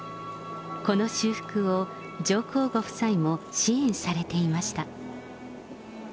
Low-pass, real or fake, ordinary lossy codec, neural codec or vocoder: none; real; none; none